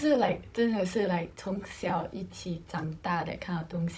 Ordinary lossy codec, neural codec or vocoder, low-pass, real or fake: none; codec, 16 kHz, 16 kbps, FunCodec, trained on Chinese and English, 50 frames a second; none; fake